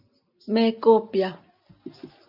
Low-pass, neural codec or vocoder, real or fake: 5.4 kHz; none; real